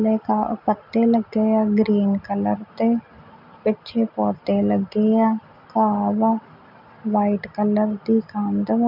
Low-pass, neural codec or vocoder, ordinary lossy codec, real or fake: 5.4 kHz; none; none; real